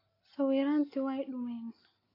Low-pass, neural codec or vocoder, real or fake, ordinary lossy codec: 5.4 kHz; none; real; AAC, 24 kbps